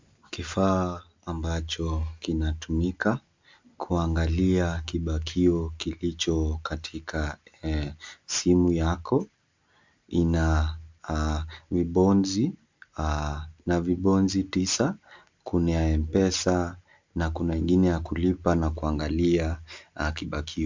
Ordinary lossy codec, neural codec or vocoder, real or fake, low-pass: MP3, 64 kbps; none; real; 7.2 kHz